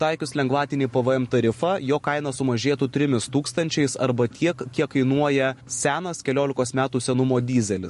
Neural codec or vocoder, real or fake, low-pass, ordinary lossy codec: none; real; 14.4 kHz; MP3, 48 kbps